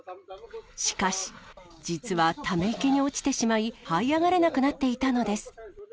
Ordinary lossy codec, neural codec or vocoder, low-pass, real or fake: none; none; none; real